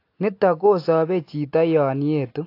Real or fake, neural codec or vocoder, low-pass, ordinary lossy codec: real; none; 5.4 kHz; AAC, 32 kbps